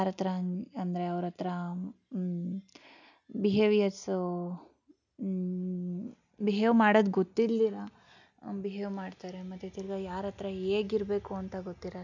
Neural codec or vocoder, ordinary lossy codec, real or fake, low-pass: none; none; real; 7.2 kHz